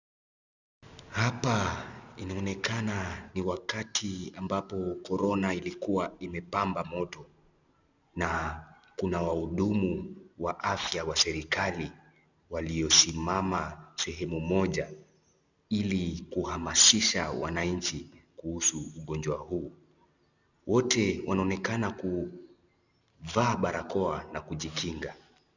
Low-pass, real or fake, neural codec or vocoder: 7.2 kHz; real; none